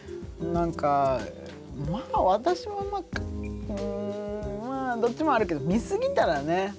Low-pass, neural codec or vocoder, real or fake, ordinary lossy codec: none; none; real; none